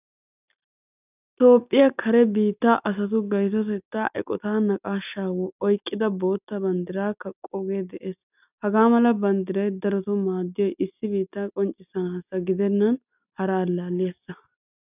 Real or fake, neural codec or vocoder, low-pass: real; none; 3.6 kHz